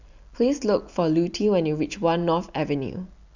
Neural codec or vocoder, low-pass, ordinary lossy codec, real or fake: none; 7.2 kHz; none; real